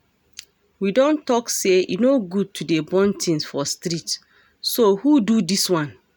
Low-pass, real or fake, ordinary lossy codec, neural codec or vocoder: none; real; none; none